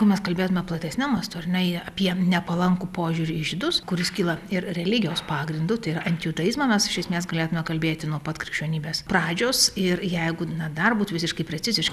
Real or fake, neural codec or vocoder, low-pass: real; none; 14.4 kHz